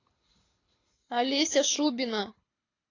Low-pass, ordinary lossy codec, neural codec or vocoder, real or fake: 7.2 kHz; AAC, 32 kbps; codec, 24 kHz, 6 kbps, HILCodec; fake